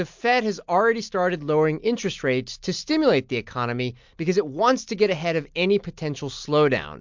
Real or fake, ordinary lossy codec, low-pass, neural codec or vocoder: real; MP3, 64 kbps; 7.2 kHz; none